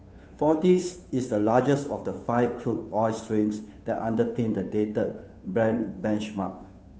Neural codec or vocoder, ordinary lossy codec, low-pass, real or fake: codec, 16 kHz, 2 kbps, FunCodec, trained on Chinese and English, 25 frames a second; none; none; fake